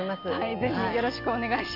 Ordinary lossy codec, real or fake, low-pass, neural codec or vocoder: none; real; 5.4 kHz; none